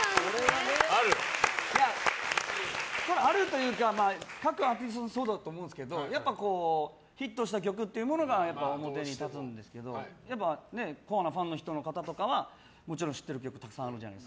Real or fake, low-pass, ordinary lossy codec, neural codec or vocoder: real; none; none; none